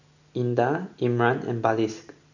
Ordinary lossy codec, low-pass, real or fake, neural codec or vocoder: none; 7.2 kHz; real; none